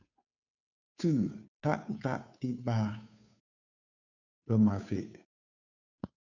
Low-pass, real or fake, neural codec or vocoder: 7.2 kHz; fake; codec, 16 kHz, 2 kbps, FunCodec, trained on Chinese and English, 25 frames a second